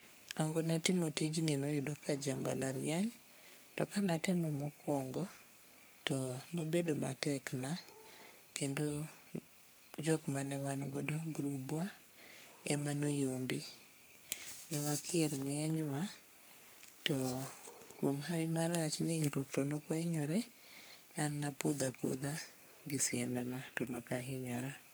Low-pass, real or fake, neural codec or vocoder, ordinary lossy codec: none; fake; codec, 44.1 kHz, 3.4 kbps, Pupu-Codec; none